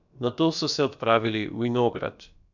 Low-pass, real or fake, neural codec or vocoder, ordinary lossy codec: 7.2 kHz; fake; codec, 16 kHz, about 1 kbps, DyCAST, with the encoder's durations; none